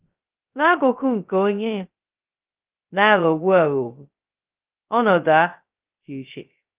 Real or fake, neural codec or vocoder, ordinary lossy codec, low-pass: fake; codec, 16 kHz, 0.2 kbps, FocalCodec; Opus, 24 kbps; 3.6 kHz